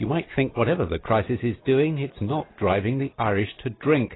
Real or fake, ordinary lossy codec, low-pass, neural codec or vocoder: real; AAC, 16 kbps; 7.2 kHz; none